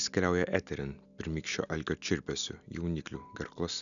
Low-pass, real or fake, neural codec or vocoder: 7.2 kHz; real; none